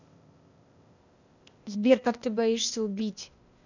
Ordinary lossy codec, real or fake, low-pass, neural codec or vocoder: none; fake; 7.2 kHz; codec, 16 kHz, 0.8 kbps, ZipCodec